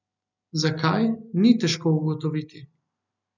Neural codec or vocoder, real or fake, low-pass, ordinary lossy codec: none; real; 7.2 kHz; none